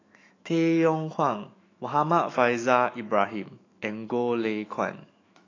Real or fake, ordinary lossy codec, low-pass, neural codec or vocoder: fake; AAC, 32 kbps; 7.2 kHz; autoencoder, 48 kHz, 128 numbers a frame, DAC-VAE, trained on Japanese speech